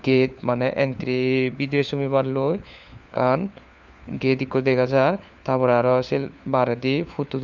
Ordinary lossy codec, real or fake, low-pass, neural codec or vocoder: none; fake; 7.2 kHz; codec, 16 kHz, 4 kbps, FunCodec, trained on LibriTTS, 50 frames a second